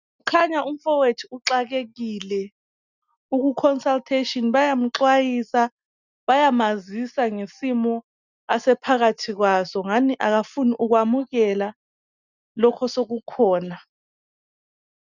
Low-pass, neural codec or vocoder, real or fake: 7.2 kHz; none; real